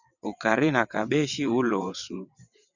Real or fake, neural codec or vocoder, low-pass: fake; vocoder, 22.05 kHz, 80 mel bands, WaveNeXt; 7.2 kHz